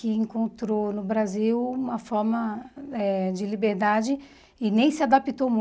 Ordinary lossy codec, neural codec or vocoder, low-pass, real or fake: none; none; none; real